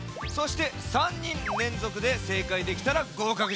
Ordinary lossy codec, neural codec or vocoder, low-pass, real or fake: none; none; none; real